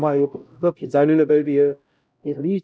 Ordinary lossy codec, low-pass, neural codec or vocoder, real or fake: none; none; codec, 16 kHz, 0.5 kbps, X-Codec, HuBERT features, trained on LibriSpeech; fake